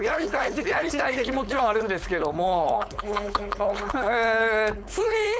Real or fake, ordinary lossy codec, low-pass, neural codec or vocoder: fake; none; none; codec, 16 kHz, 4.8 kbps, FACodec